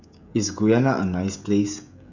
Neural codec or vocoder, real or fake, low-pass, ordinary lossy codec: codec, 16 kHz, 16 kbps, FreqCodec, smaller model; fake; 7.2 kHz; none